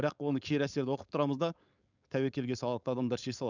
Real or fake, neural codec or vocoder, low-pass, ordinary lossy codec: fake; codec, 16 kHz, 4.8 kbps, FACodec; 7.2 kHz; none